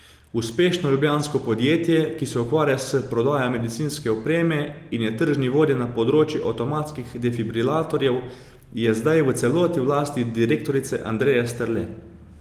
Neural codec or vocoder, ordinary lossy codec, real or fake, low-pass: none; Opus, 24 kbps; real; 14.4 kHz